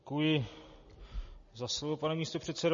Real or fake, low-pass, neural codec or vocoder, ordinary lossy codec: real; 7.2 kHz; none; MP3, 32 kbps